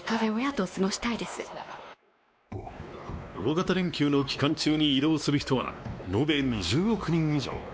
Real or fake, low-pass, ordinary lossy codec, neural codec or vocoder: fake; none; none; codec, 16 kHz, 2 kbps, X-Codec, WavLM features, trained on Multilingual LibriSpeech